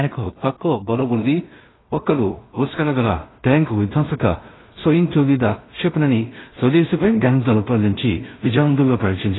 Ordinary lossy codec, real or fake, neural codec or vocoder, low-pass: AAC, 16 kbps; fake; codec, 16 kHz in and 24 kHz out, 0.4 kbps, LongCat-Audio-Codec, two codebook decoder; 7.2 kHz